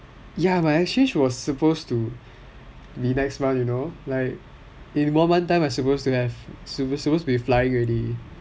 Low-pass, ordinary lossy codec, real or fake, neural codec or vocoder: none; none; real; none